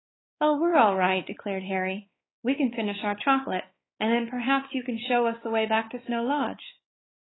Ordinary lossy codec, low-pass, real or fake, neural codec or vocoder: AAC, 16 kbps; 7.2 kHz; fake; codec, 16 kHz, 4 kbps, X-Codec, WavLM features, trained on Multilingual LibriSpeech